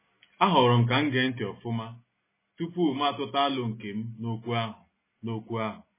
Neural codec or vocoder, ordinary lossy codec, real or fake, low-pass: none; MP3, 16 kbps; real; 3.6 kHz